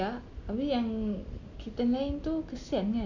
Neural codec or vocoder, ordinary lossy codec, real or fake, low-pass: none; none; real; 7.2 kHz